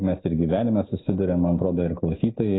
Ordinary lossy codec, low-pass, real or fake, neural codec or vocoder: AAC, 16 kbps; 7.2 kHz; real; none